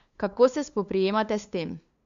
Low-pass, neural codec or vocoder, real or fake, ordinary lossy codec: 7.2 kHz; codec, 16 kHz, 2 kbps, FunCodec, trained on LibriTTS, 25 frames a second; fake; MP3, 64 kbps